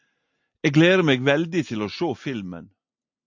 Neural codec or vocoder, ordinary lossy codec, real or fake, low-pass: none; MP3, 48 kbps; real; 7.2 kHz